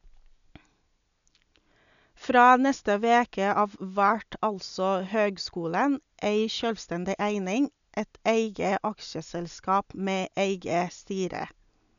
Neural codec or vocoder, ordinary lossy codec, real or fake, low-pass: none; none; real; 7.2 kHz